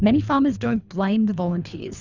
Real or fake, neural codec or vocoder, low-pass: fake; codec, 24 kHz, 3 kbps, HILCodec; 7.2 kHz